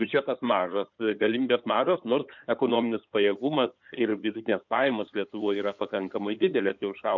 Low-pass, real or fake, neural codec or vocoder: 7.2 kHz; fake; codec, 16 kHz in and 24 kHz out, 2.2 kbps, FireRedTTS-2 codec